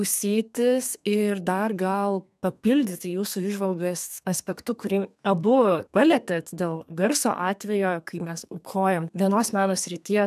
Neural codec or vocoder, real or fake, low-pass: codec, 32 kHz, 1.9 kbps, SNAC; fake; 14.4 kHz